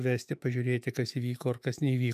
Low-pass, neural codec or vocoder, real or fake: 14.4 kHz; autoencoder, 48 kHz, 128 numbers a frame, DAC-VAE, trained on Japanese speech; fake